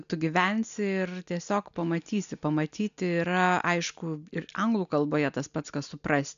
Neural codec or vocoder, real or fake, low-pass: none; real; 7.2 kHz